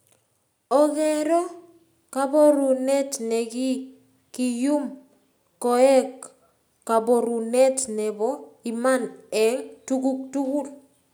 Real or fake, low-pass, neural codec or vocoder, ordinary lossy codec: real; none; none; none